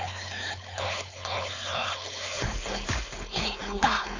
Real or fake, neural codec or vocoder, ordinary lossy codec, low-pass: fake; codec, 16 kHz, 4.8 kbps, FACodec; AAC, 48 kbps; 7.2 kHz